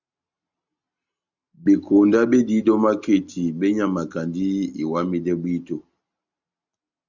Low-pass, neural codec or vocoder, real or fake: 7.2 kHz; none; real